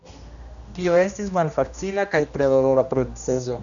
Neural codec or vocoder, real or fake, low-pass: codec, 16 kHz, 1 kbps, X-Codec, HuBERT features, trained on general audio; fake; 7.2 kHz